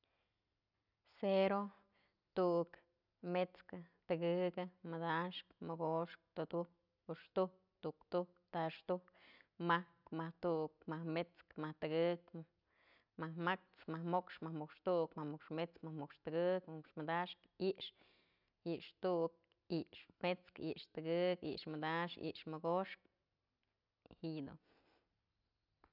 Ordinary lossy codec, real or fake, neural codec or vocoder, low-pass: none; real; none; 5.4 kHz